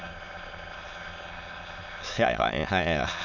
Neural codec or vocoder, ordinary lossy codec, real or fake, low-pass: autoencoder, 22.05 kHz, a latent of 192 numbers a frame, VITS, trained on many speakers; none; fake; 7.2 kHz